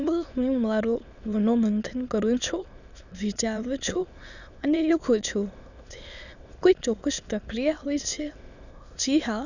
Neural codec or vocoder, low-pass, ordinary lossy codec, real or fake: autoencoder, 22.05 kHz, a latent of 192 numbers a frame, VITS, trained on many speakers; 7.2 kHz; none; fake